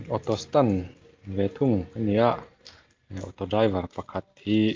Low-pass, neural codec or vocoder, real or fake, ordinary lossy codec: 7.2 kHz; none; real; Opus, 32 kbps